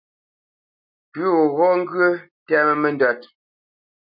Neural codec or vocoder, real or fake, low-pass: none; real; 5.4 kHz